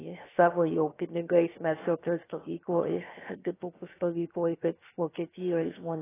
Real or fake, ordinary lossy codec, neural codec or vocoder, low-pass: fake; AAC, 16 kbps; codec, 16 kHz, 0.7 kbps, FocalCodec; 3.6 kHz